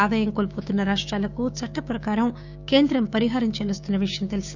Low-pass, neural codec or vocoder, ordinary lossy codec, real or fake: 7.2 kHz; codec, 16 kHz, 6 kbps, DAC; none; fake